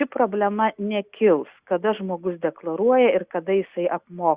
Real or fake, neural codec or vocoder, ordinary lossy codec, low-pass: real; none; Opus, 64 kbps; 3.6 kHz